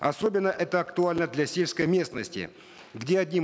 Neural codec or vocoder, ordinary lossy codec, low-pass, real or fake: none; none; none; real